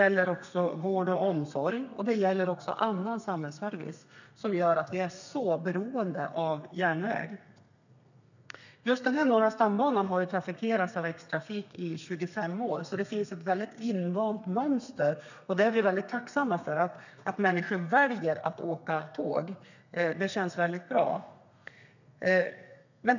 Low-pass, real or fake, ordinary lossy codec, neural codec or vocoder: 7.2 kHz; fake; none; codec, 32 kHz, 1.9 kbps, SNAC